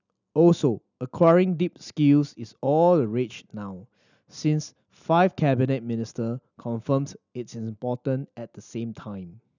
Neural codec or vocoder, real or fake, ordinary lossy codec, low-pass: none; real; none; 7.2 kHz